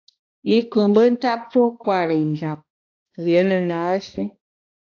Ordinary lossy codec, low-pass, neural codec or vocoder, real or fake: AAC, 48 kbps; 7.2 kHz; codec, 16 kHz, 1 kbps, X-Codec, HuBERT features, trained on balanced general audio; fake